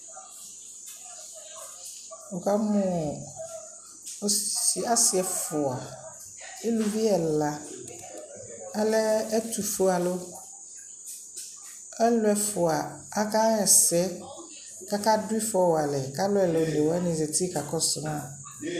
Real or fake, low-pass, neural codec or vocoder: real; 14.4 kHz; none